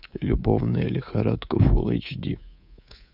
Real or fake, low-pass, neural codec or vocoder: fake; 5.4 kHz; codec, 24 kHz, 3.1 kbps, DualCodec